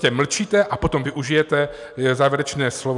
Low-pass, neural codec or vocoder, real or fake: 10.8 kHz; none; real